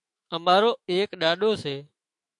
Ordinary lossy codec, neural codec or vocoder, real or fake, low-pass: AAC, 64 kbps; autoencoder, 48 kHz, 128 numbers a frame, DAC-VAE, trained on Japanese speech; fake; 10.8 kHz